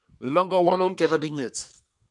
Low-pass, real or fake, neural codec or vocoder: 10.8 kHz; fake; codec, 24 kHz, 1 kbps, SNAC